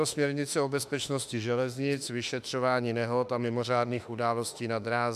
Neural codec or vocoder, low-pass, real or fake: autoencoder, 48 kHz, 32 numbers a frame, DAC-VAE, trained on Japanese speech; 14.4 kHz; fake